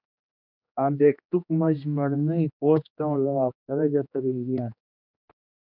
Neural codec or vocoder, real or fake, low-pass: codec, 16 kHz, 2 kbps, X-Codec, HuBERT features, trained on general audio; fake; 5.4 kHz